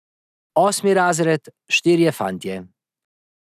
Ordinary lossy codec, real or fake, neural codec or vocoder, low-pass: none; real; none; 14.4 kHz